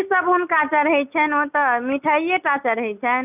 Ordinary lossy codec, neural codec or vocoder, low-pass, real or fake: none; none; 3.6 kHz; real